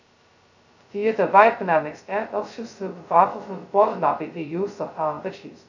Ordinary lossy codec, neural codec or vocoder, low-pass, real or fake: none; codec, 16 kHz, 0.2 kbps, FocalCodec; 7.2 kHz; fake